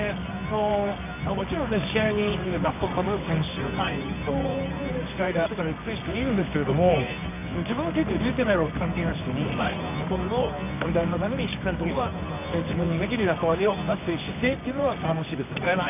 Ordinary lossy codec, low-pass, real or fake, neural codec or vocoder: none; 3.6 kHz; fake; codec, 24 kHz, 0.9 kbps, WavTokenizer, medium music audio release